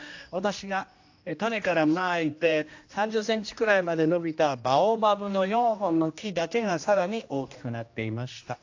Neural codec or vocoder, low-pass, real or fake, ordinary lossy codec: codec, 16 kHz, 1 kbps, X-Codec, HuBERT features, trained on general audio; 7.2 kHz; fake; AAC, 48 kbps